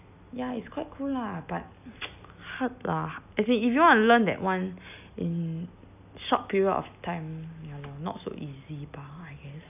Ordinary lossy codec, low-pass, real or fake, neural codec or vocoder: none; 3.6 kHz; real; none